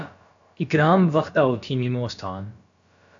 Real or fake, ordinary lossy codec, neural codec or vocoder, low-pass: fake; AAC, 64 kbps; codec, 16 kHz, about 1 kbps, DyCAST, with the encoder's durations; 7.2 kHz